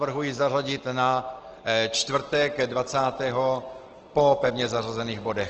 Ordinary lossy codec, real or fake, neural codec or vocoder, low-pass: Opus, 16 kbps; real; none; 7.2 kHz